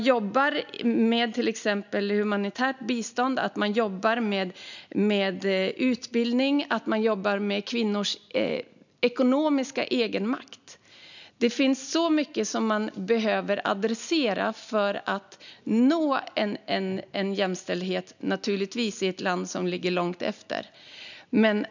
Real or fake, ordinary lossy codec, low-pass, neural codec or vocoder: real; none; 7.2 kHz; none